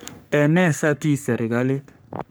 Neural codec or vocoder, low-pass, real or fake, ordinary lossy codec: codec, 44.1 kHz, 3.4 kbps, Pupu-Codec; none; fake; none